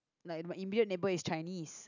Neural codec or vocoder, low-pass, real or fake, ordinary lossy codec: none; 7.2 kHz; real; none